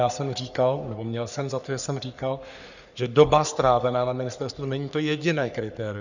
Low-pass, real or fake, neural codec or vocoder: 7.2 kHz; fake; codec, 44.1 kHz, 3.4 kbps, Pupu-Codec